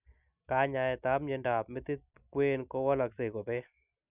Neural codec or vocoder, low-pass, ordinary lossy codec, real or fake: none; 3.6 kHz; none; real